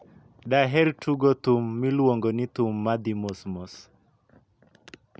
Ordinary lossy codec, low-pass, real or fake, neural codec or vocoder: none; none; real; none